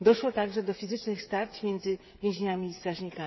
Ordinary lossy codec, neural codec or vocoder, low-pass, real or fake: MP3, 24 kbps; codec, 16 kHz, 16 kbps, FreqCodec, smaller model; 7.2 kHz; fake